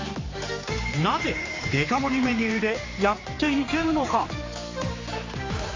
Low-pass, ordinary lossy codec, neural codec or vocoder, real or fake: 7.2 kHz; AAC, 32 kbps; codec, 16 kHz, 4 kbps, X-Codec, HuBERT features, trained on general audio; fake